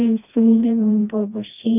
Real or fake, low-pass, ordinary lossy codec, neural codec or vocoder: fake; 3.6 kHz; none; codec, 16 kHz, 1 kbps, FreqCodec, smaller model